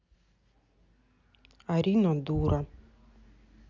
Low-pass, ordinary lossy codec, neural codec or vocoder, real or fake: 7.2 kHz; none; none; real